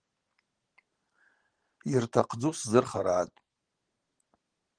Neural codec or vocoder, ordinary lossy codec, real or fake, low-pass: vocoder, 44.1 kHz, 128 mel bands every 512 samples, BigVGAN v2; Opus, 16 kbps; fake; 9.9 kHz